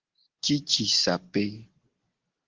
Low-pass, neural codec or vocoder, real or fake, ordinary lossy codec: 7.2 kHz; none; real; Opus, 16 kbps